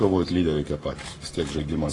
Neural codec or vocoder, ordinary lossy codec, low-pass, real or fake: codec, 44.1 kHz, 7.8 kbps, Pupu-Codec; AAC, 32 kbps; 10.8 kHz; fake